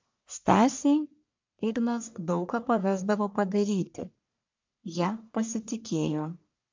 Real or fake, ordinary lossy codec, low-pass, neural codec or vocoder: fake; MP3, 64 kbps; 7.2 kHz; codec, 44.1 kHz, 1.7 kbps, Pupu-Codec